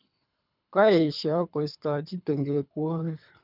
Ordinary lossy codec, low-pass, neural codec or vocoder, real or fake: none; 5.4 kHz; codec, 24 kHz, 3 kbps, HILCodec; fake